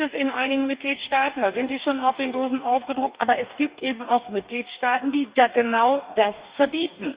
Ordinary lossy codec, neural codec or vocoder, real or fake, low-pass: Opus, 64 kbps; codec, 44.1 kHz, 2.6 kbps, DAC; fake; 3.6 kHz